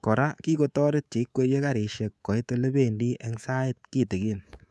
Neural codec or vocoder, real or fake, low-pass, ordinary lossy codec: codec, 24 kHz, 3.1 kbps, DualCodec; fake; none; none